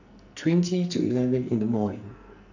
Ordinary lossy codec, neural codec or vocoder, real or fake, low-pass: none; codec, 44.1 kHz, 2.6 kbps, SNAC; fake; 7.2 kHz